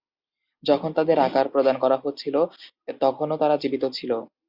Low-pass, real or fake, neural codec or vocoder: 5.4 kHz; real; none